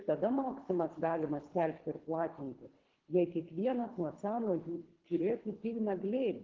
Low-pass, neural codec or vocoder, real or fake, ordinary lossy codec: 7.2 kHz; codec, 24 kHz, 3 kbps, HILCodec; fake; Opus, 16 kbps